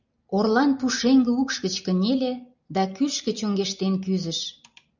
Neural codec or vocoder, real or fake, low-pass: none; real; 7.2 kHz